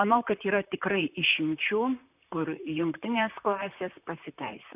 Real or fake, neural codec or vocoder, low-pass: fake; vocoder, 44.1 kHz, 128 mel bands, Pupu-Vocoder; 3.6 kHz